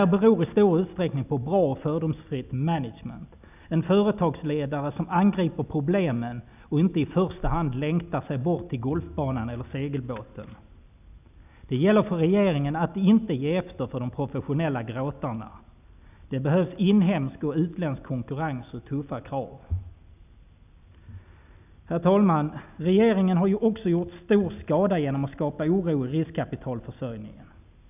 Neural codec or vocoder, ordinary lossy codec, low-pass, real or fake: none; none; 3.6 kHz; real